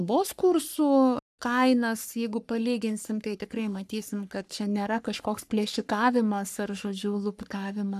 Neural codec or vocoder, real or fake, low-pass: codec, 44.1 kHz, 3.4 kbps, Pupu-Codec; fake; 14.4 kHz